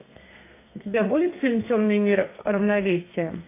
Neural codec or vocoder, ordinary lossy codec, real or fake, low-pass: codec, 32 kHz, 1.9 kbps, SNAC; AAC, 24 kbps; fake; 3.6 kHz